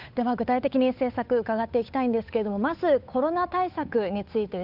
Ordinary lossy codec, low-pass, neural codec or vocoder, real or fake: none; 5.4 kHz; codec, 16 kHz, 8 kbps, FunCodec, trained on Chinese and English, 25 frames a second; fake